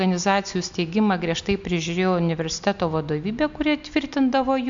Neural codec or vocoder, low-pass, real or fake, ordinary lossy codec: none; 7.2 kHz; real; MP3, 64 kbps